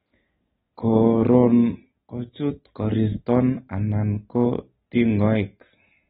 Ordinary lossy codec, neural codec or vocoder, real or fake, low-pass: AAC, 16 kbps; none; real; 19.8 kHz